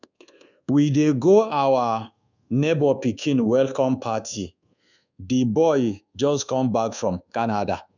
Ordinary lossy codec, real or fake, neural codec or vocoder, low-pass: none; fake; codec, 24 kHz, 1.2 kbps, DualCodec; 7.2 kHz